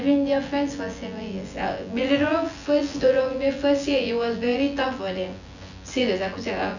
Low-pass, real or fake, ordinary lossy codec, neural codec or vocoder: 7.2 kHz; fake; none; vocoder, 24 kHz, 100 mel bands, Vocos